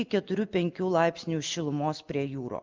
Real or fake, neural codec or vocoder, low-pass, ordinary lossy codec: real; none; 7.2 kHz; Opus, 32 kbps